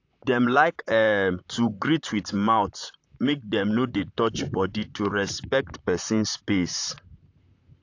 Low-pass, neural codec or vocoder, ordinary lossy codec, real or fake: 7.2 kHz; vocoder, 44.1 kHz, 128 mel bands, Pupu-Vocoder; AAC, 48 kbps; fake